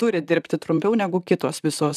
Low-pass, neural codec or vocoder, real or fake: 14.4 kHz; vocoder, 44.1 kHz, 128 mel bands, Pupu-Vocoder; fake